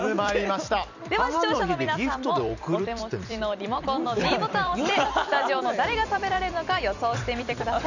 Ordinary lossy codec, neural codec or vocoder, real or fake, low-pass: none; vocoder, 44.1 kHz, 128 mel bands every 256 samples, BigVGAN v2; fake; 7.2 kHz